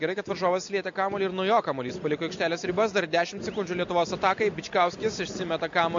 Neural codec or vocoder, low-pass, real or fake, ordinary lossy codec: none; 7.2 kHz; real; MP3, 48 kbps